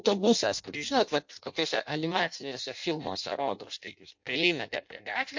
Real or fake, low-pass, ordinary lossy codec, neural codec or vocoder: fake; 7.2 kHz; MP3, 48 kbps; codec, 16 kHz in and 24 kHz out, 0.6 kbps, FireRedTTS-2 codec